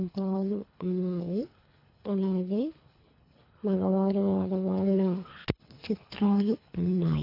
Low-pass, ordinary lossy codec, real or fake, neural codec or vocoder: 5.4 kHz; AAC, 32 kbps; fake; codec, 24 kHz, 3 kbps, HILCodec